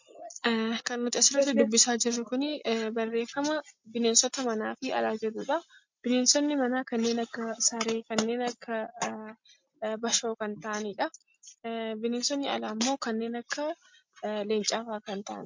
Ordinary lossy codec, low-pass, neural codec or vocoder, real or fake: MP3, 48 kbps; 7.2 kHz; none; real